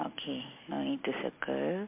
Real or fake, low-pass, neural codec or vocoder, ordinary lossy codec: real; 3.6 kHz; none; MP3, 24 kbps